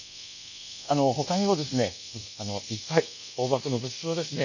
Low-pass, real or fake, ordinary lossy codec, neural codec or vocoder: 7.2 kHz; fake; none; codec, 24 kHz, 1.2 kbps, DualCodec